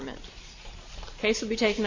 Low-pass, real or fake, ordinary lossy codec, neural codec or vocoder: 7.2 kHz; real; AAC, 48 kbps; none